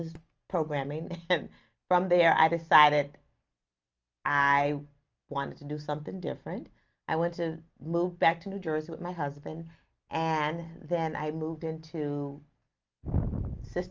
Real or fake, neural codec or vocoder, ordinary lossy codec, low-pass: real; none; Opus, 24 kbps; 7.2 kHz